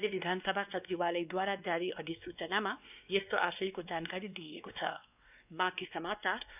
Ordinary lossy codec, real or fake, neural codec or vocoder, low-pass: none; fake; codec, 16 kHz, 2 kbps, X-Codec, WavLM features, trained on Multilingual LibriSpeech; 3.6 kHz